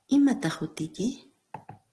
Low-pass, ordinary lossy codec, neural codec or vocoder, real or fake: 10.8 kHz; Opus, 16 kbps; none; real